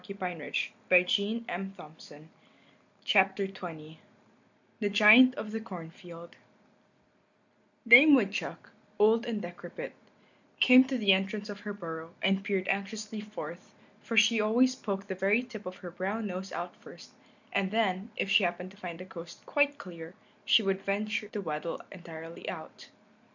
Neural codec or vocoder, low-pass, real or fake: none; 7.2 kHz; real